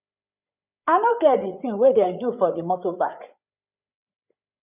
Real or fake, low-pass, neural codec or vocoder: fake; 3.6 kHz; codec, 16 kHz, 8 kbps, FreqCodec, larger model